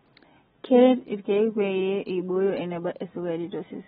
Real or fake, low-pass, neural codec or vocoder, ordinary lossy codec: real; 19.8 kHz; none; AAC, 16 kbps